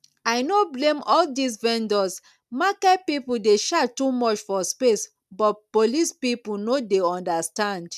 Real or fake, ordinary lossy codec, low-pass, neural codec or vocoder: real; none; 14.4 kHz; none